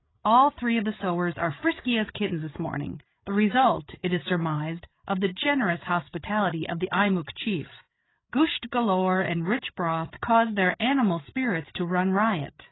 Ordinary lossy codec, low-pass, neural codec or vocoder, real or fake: AAC, 16 kbps; 7.2 kHz; codec, 16 kHz, 8 kbps, FreqCodec, larger model; fake